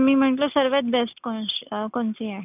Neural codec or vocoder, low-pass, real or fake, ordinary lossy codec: none; 3.6 kHz; real; none